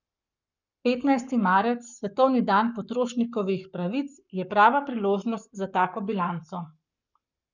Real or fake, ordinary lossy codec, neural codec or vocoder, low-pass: fake; none; codec, 44.1 kHz, 7.8 kbps, Pupu-Codec; 7.2 kHz